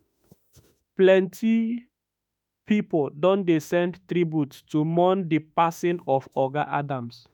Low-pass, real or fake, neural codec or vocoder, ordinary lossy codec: 19.8 kHz; fake; autoencoder, 48 kHz, 32 numbers a frame, DAC-VAE, trained on Japanese speech; none